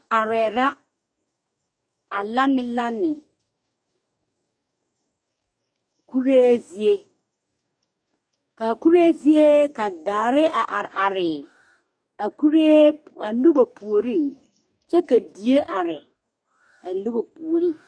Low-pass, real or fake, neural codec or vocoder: 9.9 kHz; fake; codec, 44.1 kHz, 2.6 kbps, DAC